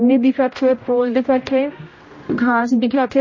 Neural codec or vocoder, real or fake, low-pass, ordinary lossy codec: codec, 16 kHz, 0.5 kbps, X-Codec, HuBERT features, trained on general audio; fake; 7.2 kHz; MP3, 32 kbps